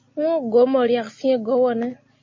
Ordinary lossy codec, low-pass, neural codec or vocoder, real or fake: MP3, 32 kbps; 7.2 kHz; none; real